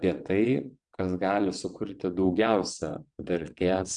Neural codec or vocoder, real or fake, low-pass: vocoder, 22.05 kHz, 80 mel bands, WaveNeXt; fake; 9.9 kHz